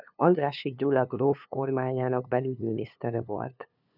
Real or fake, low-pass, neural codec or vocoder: fake; 5.4 kHz; codec, 16 kHz, 2 kbps, FunCodec, trained on LibriTTS, 25 frames a second